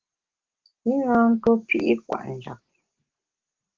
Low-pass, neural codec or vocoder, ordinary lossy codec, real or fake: 7.2 kHz; none; Opus, 16 kbps; real